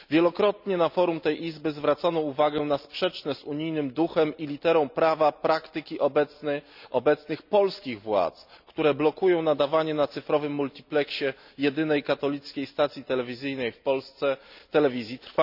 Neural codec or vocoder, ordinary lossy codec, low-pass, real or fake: none; none; 5.4 kHz; real